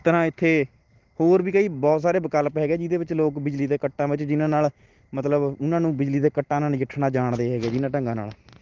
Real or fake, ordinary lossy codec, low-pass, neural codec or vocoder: real; Opus, 16 kbps; 7.2 kHz; none